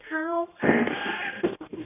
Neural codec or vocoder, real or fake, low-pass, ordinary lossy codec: codec, 16 kHz, 1 kbps, X-Codec, HuBERT features, trained on general audio; fake; 3.6 kHz; none